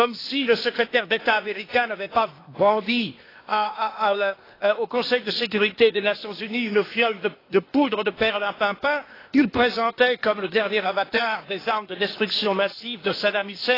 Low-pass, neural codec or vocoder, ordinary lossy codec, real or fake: 5.4 kHz; codec, 16 kHz, 0.8 kbps, ZipCodec; AAC, 24 kbps; fake